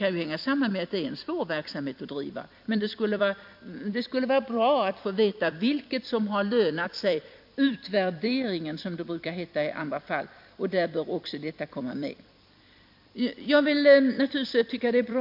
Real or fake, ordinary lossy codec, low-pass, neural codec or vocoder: fake; MP3, 48 kbps; 5.4 kHz; vocoder, 44.1 kHz, 128 mel bands every 256 samples, BigVGAN v2